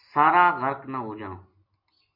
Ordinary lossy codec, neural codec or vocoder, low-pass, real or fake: MP3, 32 kbps; none; 5.4 kHz; real